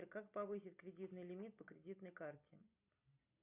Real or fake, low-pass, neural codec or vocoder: real; 3.6 kHz; none